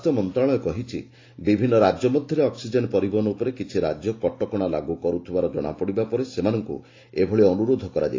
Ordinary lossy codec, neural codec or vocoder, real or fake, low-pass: AAC, 32 kbps; none; real; 7.2 kHz